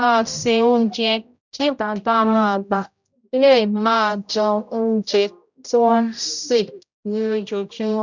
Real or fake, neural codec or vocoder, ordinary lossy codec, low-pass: fake; codec, 16 kHz, 0.5 kbps, X-Codec, HuBERT features, trained on general audio; none; 7.2 kHz